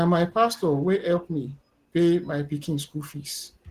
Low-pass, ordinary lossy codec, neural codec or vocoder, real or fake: 14.4 kHz; Opus, 16 kbps; none; real